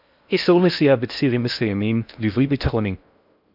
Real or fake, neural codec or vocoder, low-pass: fake; codec, 16 kHz in and 24 kHz out, 0.6 kbps, FocalCodec, streaming, 4096 codes; 5.4 kHz